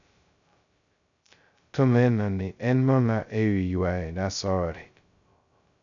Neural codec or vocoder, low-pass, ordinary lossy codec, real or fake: codec, 16 kHz, 0.2 kbps, FocalCodec; 7.2 kHz; none; fake